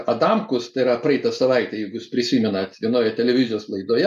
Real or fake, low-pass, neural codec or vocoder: real; 14.4 kHz; none